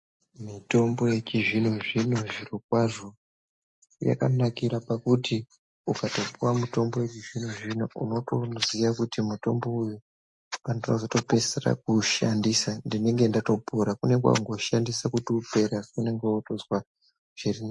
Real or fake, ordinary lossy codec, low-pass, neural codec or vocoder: real; MP3, 48 kbps; 10.8 kHz; none